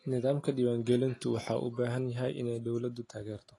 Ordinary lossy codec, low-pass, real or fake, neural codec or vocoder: AAC, 32 kbps; 10.8 kHz; real; none